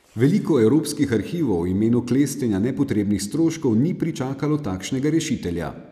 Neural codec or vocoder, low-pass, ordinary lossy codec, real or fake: none; 14.4 kHz; none; real